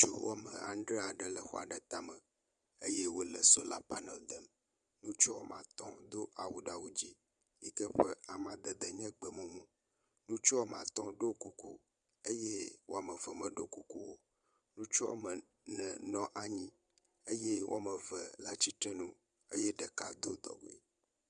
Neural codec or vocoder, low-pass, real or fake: vocoder, 22.05 kHz, 80 mel bands, Vocos; 9.9 kHz; fake